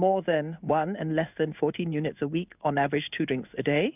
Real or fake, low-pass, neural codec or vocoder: fake; 3.6 kHz; codec, 16 kHz in and 24 kHz out, 1 kbps, XY-Tokenizer